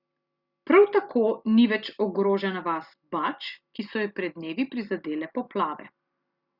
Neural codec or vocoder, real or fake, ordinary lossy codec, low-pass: none; real; Opus, 64 kbps; 5.4 kHz